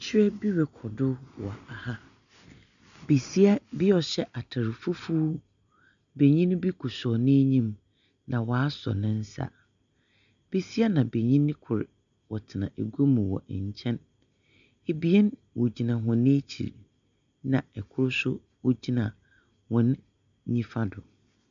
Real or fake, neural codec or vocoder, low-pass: real; none; 7.2 kHz